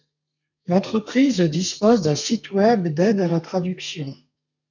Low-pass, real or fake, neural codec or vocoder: 7.2 kHz; fake; codec, 32 kHz, 1.9 kbps, SNAC